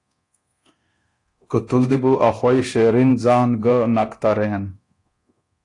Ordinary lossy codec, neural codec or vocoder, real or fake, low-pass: AAC, 48 kbps; codec, 24 kHz, 0.9 kbps, DualCodec; fake; 10.8 kHz